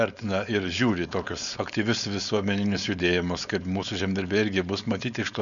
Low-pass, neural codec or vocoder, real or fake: 7.2 kHz; codec, 16 kHz, 4.8 kbps, FACodec; fake